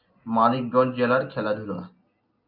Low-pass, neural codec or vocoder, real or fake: 5.4 kHz; none; real